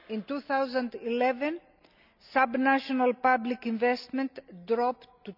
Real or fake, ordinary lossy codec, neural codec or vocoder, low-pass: real; none; none; 5.4 kHz